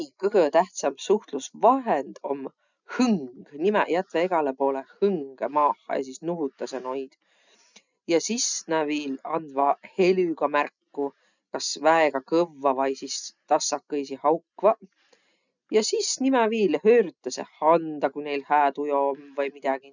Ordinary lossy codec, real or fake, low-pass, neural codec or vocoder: none; real; 7.2 kHz; none